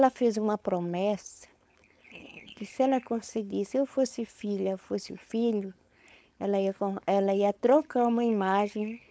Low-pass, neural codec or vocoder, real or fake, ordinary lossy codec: none; codec, 16 kHz, 4.8 kbps, FACodec; fake; none